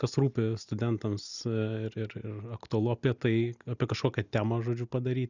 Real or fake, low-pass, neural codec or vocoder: real; 7.2 kHz; none